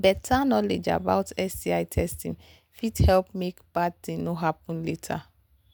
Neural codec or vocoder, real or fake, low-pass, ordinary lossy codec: none; real; none; none